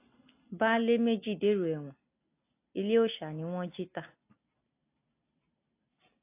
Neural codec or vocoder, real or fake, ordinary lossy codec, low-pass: none; real; AAC, 24 kbps; 3.6 kHz